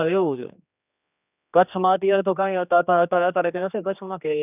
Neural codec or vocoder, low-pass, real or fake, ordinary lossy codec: codec, 16 kHz, 2 kbps, X-Codec, HuBERT features, trained on general audio; 3.6 kHz; fake; none